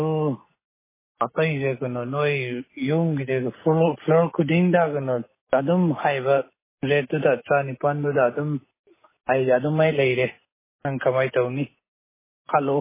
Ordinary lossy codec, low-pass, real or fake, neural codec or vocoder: MP3, 16 kbps; 3.6 kHz; real; none